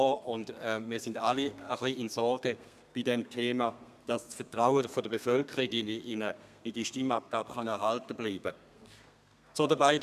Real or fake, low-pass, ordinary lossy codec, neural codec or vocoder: fake; 14.4 kHz; none; codec, 32 kHz, 1.9 kbps, SNAC